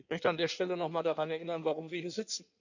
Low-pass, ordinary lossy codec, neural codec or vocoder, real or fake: 7.2 kHz; none; codec, 16 kHz in and 24 kHz out, 1.1 kbps, FireRedTTS-2 codec; fake